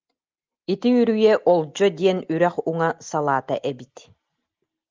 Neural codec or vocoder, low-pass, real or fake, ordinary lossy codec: none; 7.2 kHz; real; Opus, 32 kbps